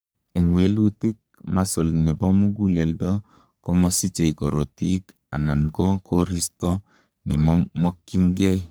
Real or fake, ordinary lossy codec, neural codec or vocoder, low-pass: fake; none; codec, 44.1 kHz, 3.4 kbps, Pupu-Codec; none